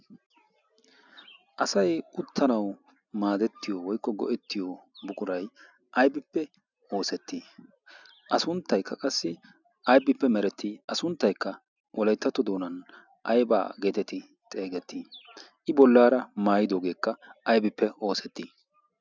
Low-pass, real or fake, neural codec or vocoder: 7.2 kHz; real; none